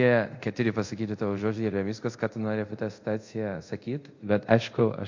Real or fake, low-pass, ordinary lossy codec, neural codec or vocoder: fake; 7.2 kHz; MP3, 48 kbps; codec, 24 kHz, 0.5 kbps, DualCodec